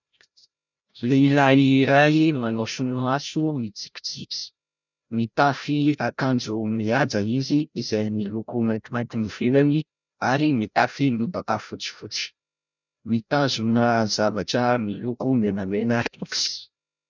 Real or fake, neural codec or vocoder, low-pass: fake; codec, 16 kHz, 0.5 kbps, FreqCodec, larger model; 7.2 kHz